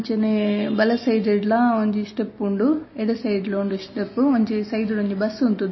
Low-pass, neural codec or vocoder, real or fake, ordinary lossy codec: 7.2 kHz; none; real; MP3, 24 kbps